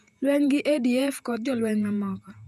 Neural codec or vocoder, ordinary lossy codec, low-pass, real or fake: vocoder, 48 kHz, 128 mel bands, Vocos; none; 14.4 kHz; fake